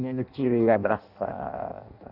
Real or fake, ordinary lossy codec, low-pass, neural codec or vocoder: fake; none; 5.4 kHz; codec, 16 kHz in and 24 kHz out, 0.6 kbps, FireRedTTS-2 codec